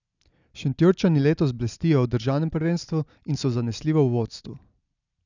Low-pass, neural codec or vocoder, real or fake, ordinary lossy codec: 7.2 kHz; none; real; none